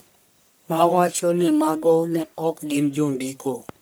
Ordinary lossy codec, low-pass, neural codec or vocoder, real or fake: none; none; codec, 44.1 kHz, 1.7 kbps, Pupu-Codec; fake